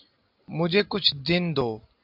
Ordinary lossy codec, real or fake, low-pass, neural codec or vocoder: AAC, 48 kbps; real; 5.4 kHz; none